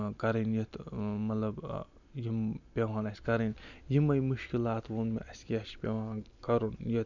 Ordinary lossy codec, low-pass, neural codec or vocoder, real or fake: none; 7.2 kHz; none; real